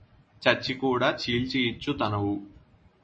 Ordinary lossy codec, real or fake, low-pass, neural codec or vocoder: MP3, 32 kbps; real; 9.9 kHz; none